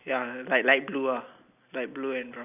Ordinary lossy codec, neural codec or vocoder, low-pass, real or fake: none; none; 3.6 kHz; real